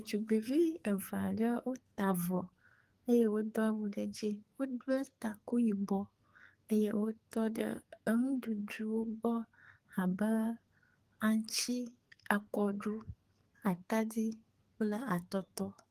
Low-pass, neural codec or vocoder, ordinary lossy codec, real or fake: 14.4 kHz; codec, 44.1 kHz, 2.6 kbps, SNAC; Opus, 24 kbps; fake